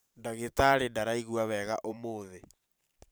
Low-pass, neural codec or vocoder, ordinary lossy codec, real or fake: none; vocoder, 44.1 kHz, 128 mel bands every 512 samples, BigVGAN v2; none; fake